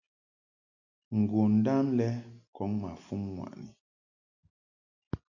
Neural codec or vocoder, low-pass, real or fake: none; 7.2 kHz; real